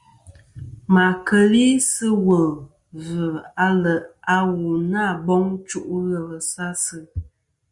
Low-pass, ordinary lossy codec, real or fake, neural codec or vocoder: 10.8 kHz; Opus, 64 kbps; real; none